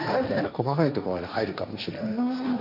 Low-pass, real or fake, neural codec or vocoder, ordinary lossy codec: 5.4 kHz; fake; codec, 16 kHz, 1.1 kbps, Voila-Tokenizer; none